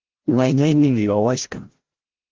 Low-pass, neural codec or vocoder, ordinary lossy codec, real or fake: 7.2 kHz; codec, 16 kHz, 0.5 kbps, FreqCodec, larger model; Opus, 16 kbps; fake